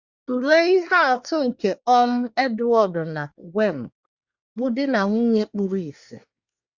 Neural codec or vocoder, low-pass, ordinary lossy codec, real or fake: codec, 24 kHz, 1 kbps, SNAC; 7.2 kHz; Opus, 64 kbps; fake